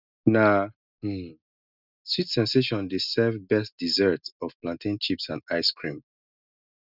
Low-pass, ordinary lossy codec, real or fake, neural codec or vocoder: 5.4 kHz; none; real; none